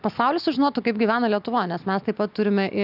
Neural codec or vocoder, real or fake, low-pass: none; real; 5.4 kHz